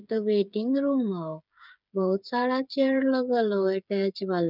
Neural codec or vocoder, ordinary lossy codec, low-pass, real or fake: codec, 16 kHz, 8 kbps, FreqCodec, smaller model; none; 5.4 kHz; fake